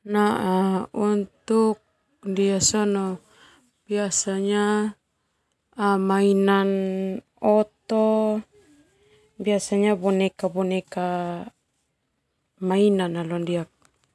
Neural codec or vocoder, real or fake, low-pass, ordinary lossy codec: none; real; none; none